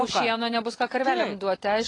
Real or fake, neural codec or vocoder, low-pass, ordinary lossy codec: fake; vocoder, 44.1 kHz, 128 mel bands every 256 samples, BigVGAN v2; 10.8 kHz; AAC, 32 kbps